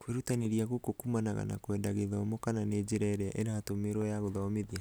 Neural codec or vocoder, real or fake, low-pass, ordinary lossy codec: none; real; none; none